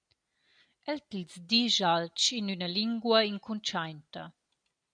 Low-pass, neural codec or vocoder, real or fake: 9.9 kHz; none; real